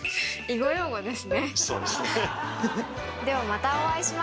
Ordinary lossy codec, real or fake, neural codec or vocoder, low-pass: none; real; none; none